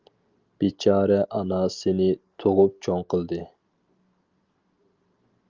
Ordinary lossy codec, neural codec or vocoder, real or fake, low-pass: Opus, 32 kbps; none; real; 7.2 kHz